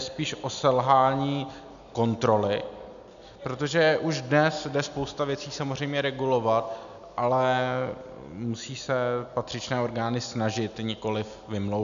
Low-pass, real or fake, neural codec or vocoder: 7.2 kHz; real; none